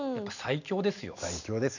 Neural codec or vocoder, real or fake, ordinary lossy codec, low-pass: none; real; none; 7.2 kHz